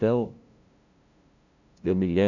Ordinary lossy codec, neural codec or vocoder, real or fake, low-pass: none; codec, 16 kHz, 0.5 kbps, FunCodec, trained on LibriTTS, 25 frames a second; fake; 7.2 kHz